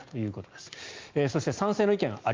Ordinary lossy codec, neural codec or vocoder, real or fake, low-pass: Opus, 24 kbps; none; real; 7.2 kHz